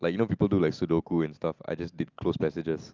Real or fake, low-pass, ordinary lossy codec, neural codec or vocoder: real; 7.2 kHz; Opus, 24 kbps; none